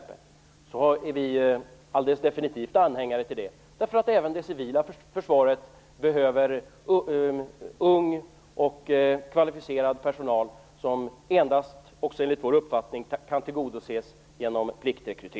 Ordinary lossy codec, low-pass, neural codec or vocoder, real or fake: none; none; none; real